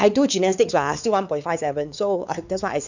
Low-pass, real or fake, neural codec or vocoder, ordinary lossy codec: 7.2 kHz; fake; codec, 16 kHz, 4 kbps, X-Codec, WavLM features, trained on Multilingual LibriSpeech; none